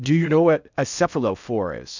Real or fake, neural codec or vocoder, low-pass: fake; codec, 16 kHz in and 24 kHz out, 0.6 kbps, FocalCodec, streaming, 4096 codes; 7.2 kHz